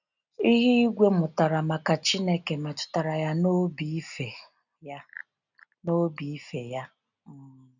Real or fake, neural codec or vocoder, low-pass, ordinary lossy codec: real; none; 7.2 kHz; none